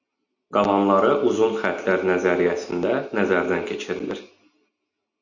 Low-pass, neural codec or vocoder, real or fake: 7.2 kHz; none; real